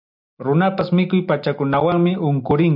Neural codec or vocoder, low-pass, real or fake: none; 5.4 kHz; real